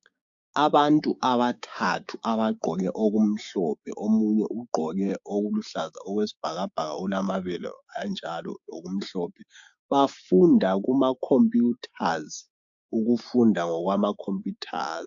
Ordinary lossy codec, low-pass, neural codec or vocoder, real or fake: AAC, 64 kbps; 7.2 kHz; codec, 16 kHz, 6 kbps, DAC; fake